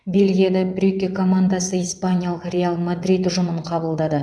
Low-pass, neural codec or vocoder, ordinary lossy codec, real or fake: none; vocoder, 22.05 kHz, 80 mel bands, WaveNeXt; none; fake